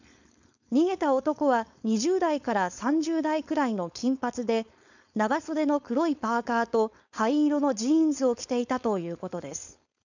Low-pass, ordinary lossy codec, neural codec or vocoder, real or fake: 7.2 kHz; none; codec, 16 kHz, 4.8 kbps, FACodec; fake